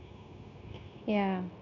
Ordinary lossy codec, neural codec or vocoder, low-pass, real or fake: none; codec, 16 kHz, 0.9 kbps, LongCat-Audio-Codec; 7.2 kHz; fake